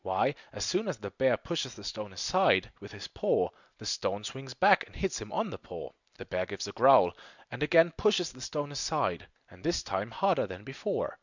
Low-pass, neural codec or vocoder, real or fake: 7.2 kHz; none; real